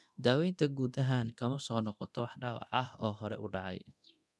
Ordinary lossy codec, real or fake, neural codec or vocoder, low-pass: none; fake; codec, 24 kHz, 0.9 kbps, DualCodec; none